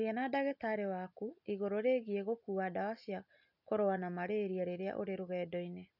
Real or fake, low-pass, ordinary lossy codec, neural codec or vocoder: real; 5.4 kHz; none; none